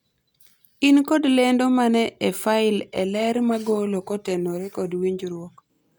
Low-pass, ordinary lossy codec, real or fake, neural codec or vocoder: none; none; real; none